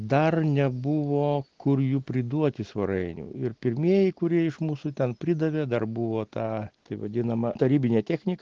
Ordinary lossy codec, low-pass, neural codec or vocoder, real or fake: Opus, 16 kbps; 7.2 kHz; none; real